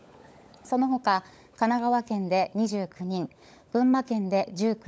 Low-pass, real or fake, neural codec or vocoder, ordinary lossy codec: none; fake; codec, 16 kHz, 16 kbps, FunCodec, trained on LibriTTS, 50 frames a second; none